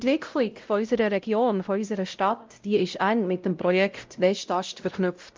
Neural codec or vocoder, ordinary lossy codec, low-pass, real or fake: codec, 16 kHz, 0.5 kbps, X-Codec, WavLM features, trained on Multilingual LibriSpeech; Opus, 24 kbps; 7.2 kHz; fake